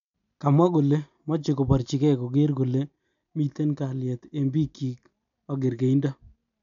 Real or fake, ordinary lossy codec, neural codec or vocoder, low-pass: real; none; none; 7.2 kHz